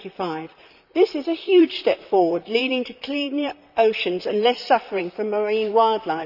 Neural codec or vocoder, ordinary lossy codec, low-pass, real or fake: vocoder, 44.1 kHz, 128 mel bands, Pupu-Vocoder; none; 5.4 kHz; fake